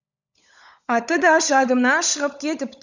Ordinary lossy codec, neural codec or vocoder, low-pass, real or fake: none; codec, 16 kHz, 16 kbps, FunCodec, trained on LibriTTS, 50 frames a second; 7.2 kHz; fake